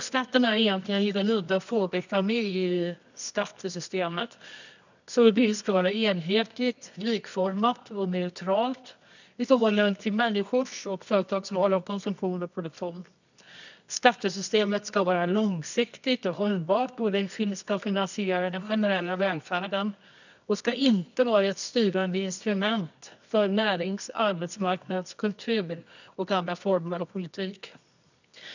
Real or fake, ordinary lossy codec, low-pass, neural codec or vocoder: fake; none; 7.2 kHz; codec, 24 kHz, 0.9 kbps, WavTokenizer, medium music audio release